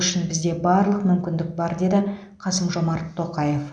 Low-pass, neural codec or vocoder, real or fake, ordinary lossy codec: 9.9 kHz; none; real; none